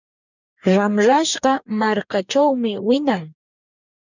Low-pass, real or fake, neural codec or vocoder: 7.2 kHz; fake; codec, 44.1 kHz, 2.6 kbps, DAC